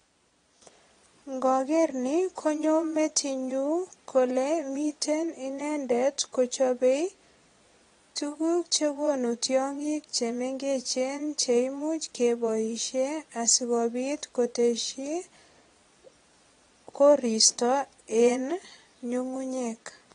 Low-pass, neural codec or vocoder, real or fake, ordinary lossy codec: 9.9 kHz; vocoder, 22.05 kHz, 80 mel bands, Vocos; fake; AAC, 32 kbps